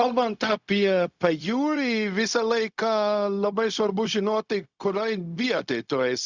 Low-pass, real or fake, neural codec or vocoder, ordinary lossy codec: 7.2 kHz; fake; codec, 16 kHz, 0.4 kbps, LongCat-Audio-Codec; Opus, 64 kbps